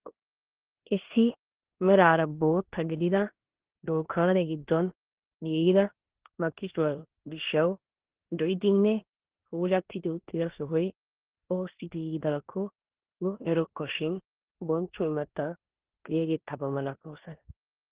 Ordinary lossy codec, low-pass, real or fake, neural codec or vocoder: Opus, 16 kbps; 3.6 kHz; fake; codec, 16 kHz in and 24 kHz out, 0.9 kbps, LongCat-Audio-Codec, four codebook decoder